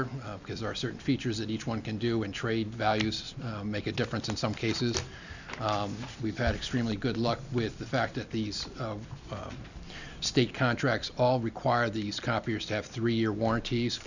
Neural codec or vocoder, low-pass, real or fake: none; 7.2 kHz; real